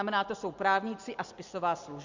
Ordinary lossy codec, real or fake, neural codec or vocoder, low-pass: Opus, 64 kbps; real; none; 7.2 kHz